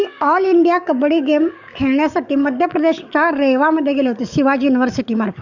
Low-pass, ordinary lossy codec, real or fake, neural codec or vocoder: 7.2 kHz; none; fake; codec, 44.1 kHz, 7.8 kbps, DAC